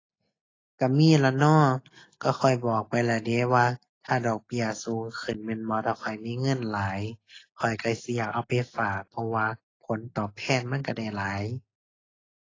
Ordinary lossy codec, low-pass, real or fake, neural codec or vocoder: AAC, 32 kbps; 7.2 kHz; real; none